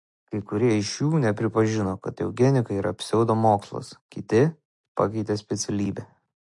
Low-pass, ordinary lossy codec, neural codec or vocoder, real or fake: 10.8 kHz; MP3, 48 kbps; none; real